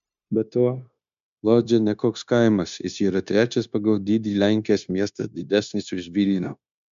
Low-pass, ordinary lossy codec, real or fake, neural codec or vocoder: 7.2 kHz; MP3, 64 kbps; fake; codec, 16 kHz, 0.9 kbps, LongCat-Audio-Codec